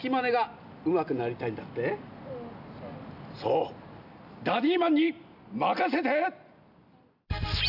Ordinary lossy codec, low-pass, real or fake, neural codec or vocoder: none; 5.4 kHz; real; none